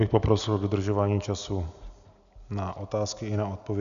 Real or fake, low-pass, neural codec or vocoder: real; 7.2 kHz; none